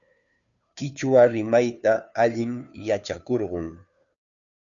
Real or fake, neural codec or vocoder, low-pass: fake; codec, 16 kHz, 2 kbps, FunCodec, trained on Chinese and English, 25 frames a second; 7.2 kHz